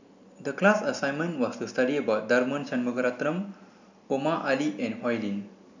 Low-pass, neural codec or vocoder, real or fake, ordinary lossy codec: 7.2 kHz; none; real; none